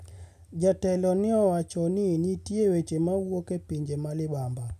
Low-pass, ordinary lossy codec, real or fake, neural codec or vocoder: 14.4 kHz; none; real; none